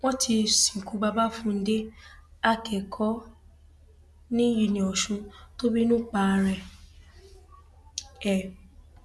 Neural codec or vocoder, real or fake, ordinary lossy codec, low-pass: none; real; none; none